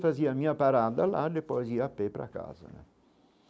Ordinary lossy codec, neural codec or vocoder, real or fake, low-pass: none; none; real; none